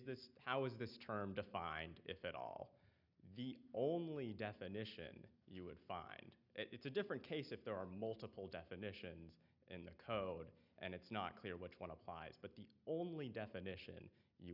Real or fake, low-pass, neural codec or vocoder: real; 5.4 kHz; none